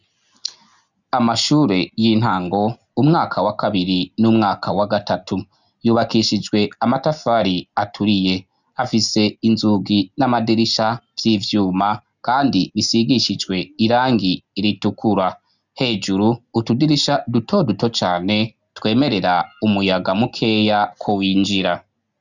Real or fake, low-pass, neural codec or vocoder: real; 7.2 kHz; none